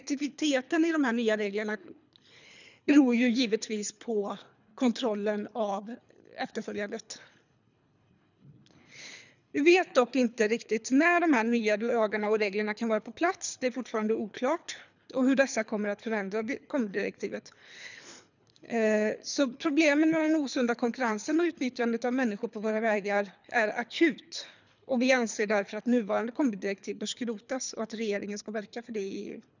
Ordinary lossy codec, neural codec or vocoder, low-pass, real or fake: none; codec, 24 kHz, 3 kbps, HILCodec; 7.2 kHz; fake